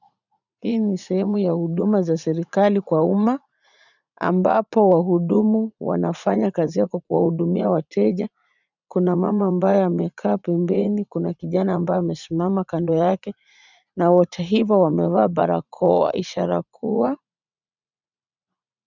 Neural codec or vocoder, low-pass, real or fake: vocoder, 44.1 kHz, 80 mel bands, Vocos; 7.2 kHz; fake